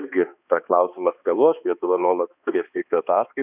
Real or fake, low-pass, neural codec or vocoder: fake; 3.6 kHz; codec, 16 kHz, 2 kbps, X-Codec, HuBERT features, trained on balanced general audio